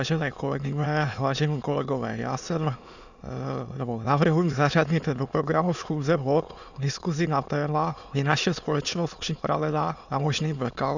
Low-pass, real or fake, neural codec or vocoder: 7.2 kHz; fake; autoencoder, 22.05 kHz, a latent of 192 numbers a frame, VITS, trained on many speakers